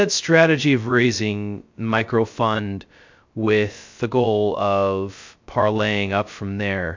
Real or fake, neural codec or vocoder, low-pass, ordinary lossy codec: fake; codec, 16 kHz, 0.2 kbps, FocalCodec; 7.2 kHz; MP3, 64 kbps